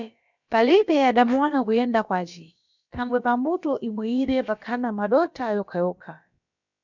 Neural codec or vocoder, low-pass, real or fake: codec, 16 kHz, about 1 kbps, DyCAST, with the encoder's durations; 7.2 kHz; fake